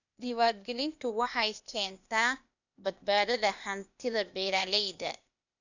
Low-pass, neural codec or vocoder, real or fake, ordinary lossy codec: 7.2 kHz; codec, 16 kHz, 0.8 kbps, ZipCodec; fake; none